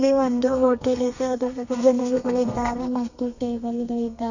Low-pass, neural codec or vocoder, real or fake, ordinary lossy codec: 7.2 kHz; codec, 32 kHz, 1.9 kbps, SNAC; fake; none